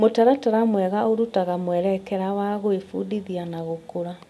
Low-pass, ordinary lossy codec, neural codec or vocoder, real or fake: none; none; none; real